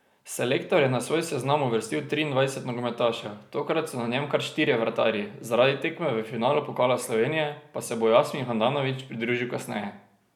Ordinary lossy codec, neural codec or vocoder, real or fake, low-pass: none; none; real; 19.8 kHz